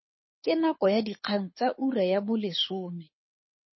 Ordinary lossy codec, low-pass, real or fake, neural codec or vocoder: MP3, 24 kbps; 7.2 kHz; fake; codec, 24 kHz, 6 kbps, HILCodec